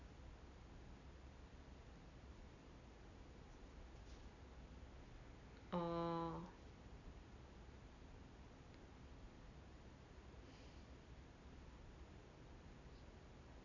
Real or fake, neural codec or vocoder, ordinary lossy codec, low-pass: real; none; none; 7.2 kHz